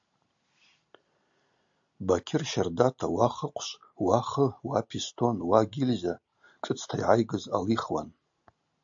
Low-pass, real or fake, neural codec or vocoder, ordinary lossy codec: 7.2 kHz; real; none; AAC, 64 kbps